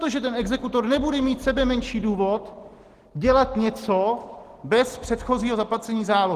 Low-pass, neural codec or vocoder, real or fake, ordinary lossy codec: 14.4 kHz; none; real; Opus, 16 kbps